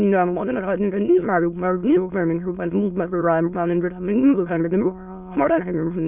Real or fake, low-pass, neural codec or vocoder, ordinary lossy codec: fake; 3.6 kHz; autoencoder, 22.05 kHz, a latent of 192 numbers a frame, VITS, trained on many speakers; none